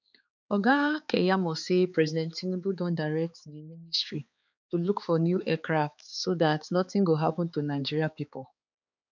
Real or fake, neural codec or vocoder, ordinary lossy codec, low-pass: fake; codec, 16 kHz, 4 kbps, X-Codec, HuBERT features, trained on balanced general audio; none; 7.2 kHz